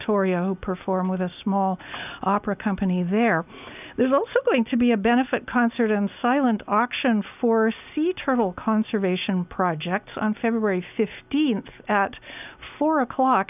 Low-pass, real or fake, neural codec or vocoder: 3.6 kHz; real; none